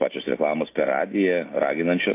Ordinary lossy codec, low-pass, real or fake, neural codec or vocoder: AAC, 24 kbps; 3.6 kHz; real; none